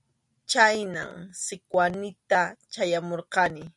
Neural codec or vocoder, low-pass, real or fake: none; 10.8 kHz; real